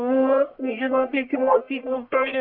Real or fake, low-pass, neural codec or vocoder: fake; 5.4 kHz; codec, 44.1 kHz, 1.7 kbps, Pupu-Codec